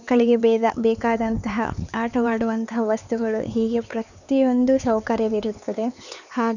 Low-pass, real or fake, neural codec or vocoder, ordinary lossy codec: 7.2 kHz; fake; codec, 16 kHz, 4 kbps, X-Codec, HuBERT features, trained on LibriSpeech; none